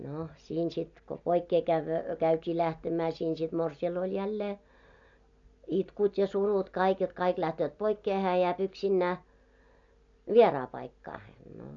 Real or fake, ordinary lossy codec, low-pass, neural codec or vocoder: real; AAC, 64 kbps; 7.2 kHz; none